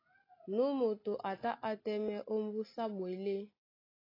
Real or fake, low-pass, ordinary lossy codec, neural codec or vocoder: real; 5.4 kHz; AAC, 24 kbps; none